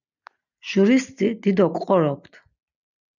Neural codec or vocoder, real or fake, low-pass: none; real; 7.2 kHz